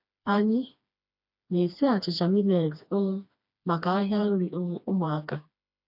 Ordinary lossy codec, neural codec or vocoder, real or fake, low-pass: none; codec, 16 kHz, 2 kbps, FreqCodec, smaller model; fake; 5.4 kHz